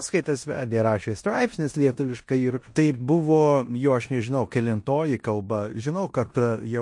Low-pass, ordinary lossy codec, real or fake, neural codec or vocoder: 10.8 kHz; MP3, 48 kbps; fake; codec, 16 kHz in and 24 kHz out, 0.9 kbps, LongCat-Audio-Codec, four codebook decoder